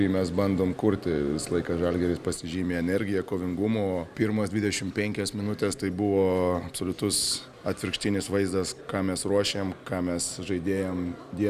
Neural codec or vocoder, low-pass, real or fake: none; 14.4 kHz; real